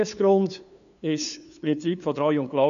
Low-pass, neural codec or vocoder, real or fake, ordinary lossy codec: 7.2 kHz; codec, 16 kHz, 2 kbps, FunCodec, trained on LibriTTS, 25 frames a second; fake; none